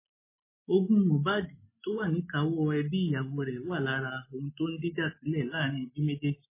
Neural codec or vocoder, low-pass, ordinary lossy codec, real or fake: none; 3.6 kHz; MP3, 16 kbps; real